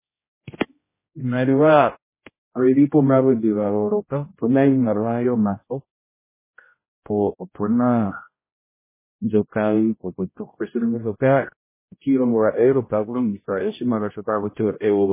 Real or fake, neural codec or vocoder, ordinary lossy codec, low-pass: fake; codec, 16 kHz, 0.5 kbps, X-Codec, HuBERT features, trained on general audio; MP3, 16 kbps; 3.6 kHz